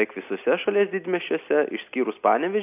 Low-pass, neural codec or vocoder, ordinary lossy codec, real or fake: 3.6 kHz; none; AAC, 32 kbps; real